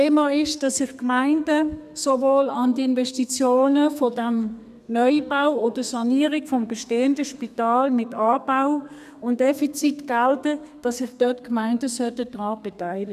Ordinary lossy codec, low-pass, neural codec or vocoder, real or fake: none; 14.4 kHz; codec, 32 kHz, 1.9 kbps, SNAC; fake